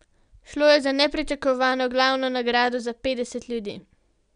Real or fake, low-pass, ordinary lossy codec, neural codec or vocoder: real; 9.9 kHz; none; none